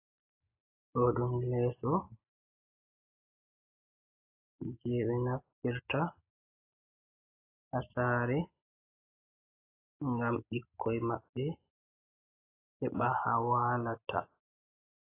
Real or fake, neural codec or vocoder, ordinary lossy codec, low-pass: real; none; AAC, 24 kbps; 3.6 kHz